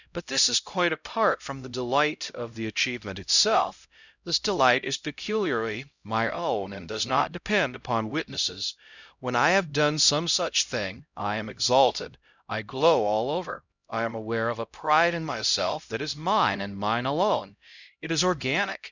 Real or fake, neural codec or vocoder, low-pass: fake; codec, 16 kHz, 0.5 kbps, X-Codec, HuBERT features, trained on LibriSpeech; 7.2 kHz